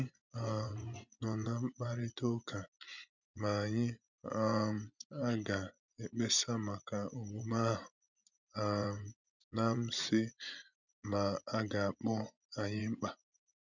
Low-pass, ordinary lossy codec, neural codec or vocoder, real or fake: 7.2 kHz; none; vocoder, 24 kHz, 100 mel bands, Vocos; fake